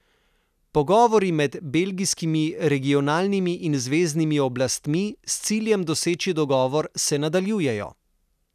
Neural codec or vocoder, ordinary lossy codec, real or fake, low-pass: none; none; real; 14.4 kHz